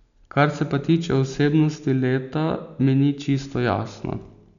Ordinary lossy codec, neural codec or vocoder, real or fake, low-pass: none; none; real; 7.2 kHz